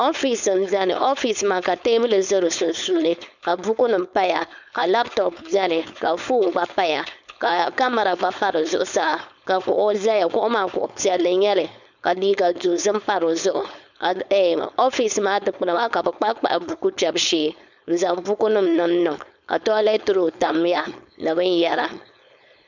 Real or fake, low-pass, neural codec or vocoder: fake; 7.2 kHz; codec, 16 kHz, 4.8 kbps, FACodec